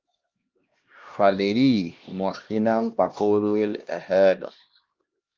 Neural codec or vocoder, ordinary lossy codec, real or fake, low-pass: codec, 16 kHz, 1 kbps, X-Codec, HuBERT features, trained on LibriSpeech; Opus, 24 kbps; fake; 7.2 kHz